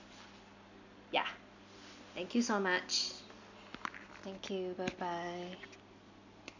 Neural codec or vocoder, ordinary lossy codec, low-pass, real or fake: none; none; 7.2 kHz; real